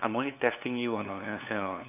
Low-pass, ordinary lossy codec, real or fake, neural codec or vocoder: 3.6 kHz; none; fake; codec, 16 kHz, 2 kbps, FunCodec, trained on LibriTTS, 25 frames a second